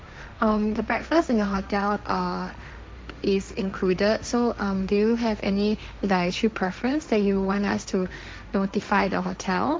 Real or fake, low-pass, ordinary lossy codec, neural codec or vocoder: fake; none; none; codec, 16 kHz, 1.1 kbps, Voila-Tokenizer